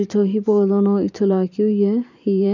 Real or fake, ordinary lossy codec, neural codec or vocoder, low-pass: fake; none; vocoder, 44.1 kHz, 128 mel bands every 512 samples, BigVGAN v2; 7.2 kHz